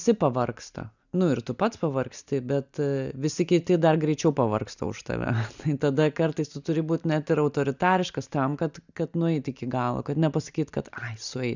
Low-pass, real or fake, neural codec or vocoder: 7.2 kHz; real; none